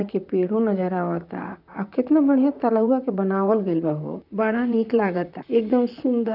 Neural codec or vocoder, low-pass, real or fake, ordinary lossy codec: vocoder, 44.1 kHz, 128 mel bands, Pupu-Vocoder; 5.4 kHz; fake; none